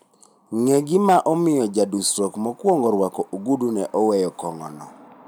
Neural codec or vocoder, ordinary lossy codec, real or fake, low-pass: none; none; real; none